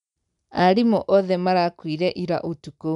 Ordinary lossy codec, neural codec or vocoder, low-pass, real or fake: none; none; 10.8 kHz; real